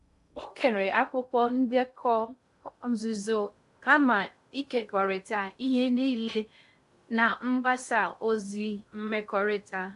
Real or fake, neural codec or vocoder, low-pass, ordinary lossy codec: fake; codec, 16 kHz in and 24 kHz out, 0.6 kbps, FocalCodec, streaming, 2048 codes; 10.8 kHz; AAC, 64 kbps